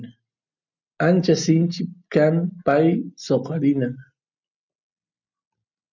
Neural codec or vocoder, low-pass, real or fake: none; 7.2 kHz; real